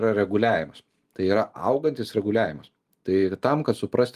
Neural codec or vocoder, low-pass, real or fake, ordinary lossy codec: none; 14.4 kHz; real; Opus, 32 kbps